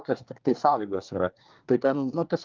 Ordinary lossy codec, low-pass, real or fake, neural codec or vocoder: Opus, 24 kbps; 7.2 kHz; fake; codec, 24 kHz, 1 kbps, SNAC